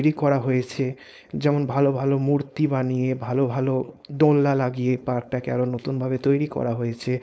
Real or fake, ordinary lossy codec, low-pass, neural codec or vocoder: fake; none; none; codec, 16 kHz, 4.8 kbps, FACodec